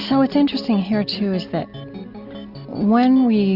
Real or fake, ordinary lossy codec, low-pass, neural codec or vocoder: real; Opus, 64 kbps; 5.4 kHz; none